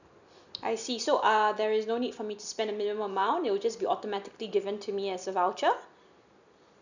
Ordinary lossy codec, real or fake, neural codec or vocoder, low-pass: none; real; none; 7.2 kHz